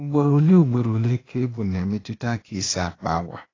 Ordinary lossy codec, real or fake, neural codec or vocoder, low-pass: AAC, 32 kbps; fake; codec, 16 kHz, 0.8 kbps, ZipCodec; 7.2 kHz